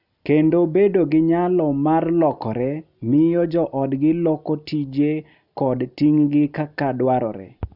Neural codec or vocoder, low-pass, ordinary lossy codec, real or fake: none; 5.4 kHz; none; real